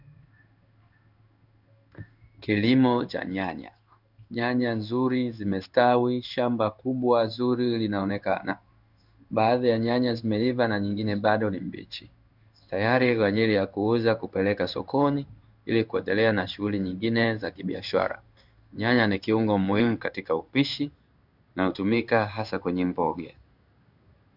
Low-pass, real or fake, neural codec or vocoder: 5.4 kHz; fake; codec, 16 kHz in and 24 kHz out, 1 kbps, XY-Tokenizer